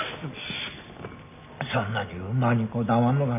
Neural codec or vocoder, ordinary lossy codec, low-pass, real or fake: none; none; 3.6 kHz; real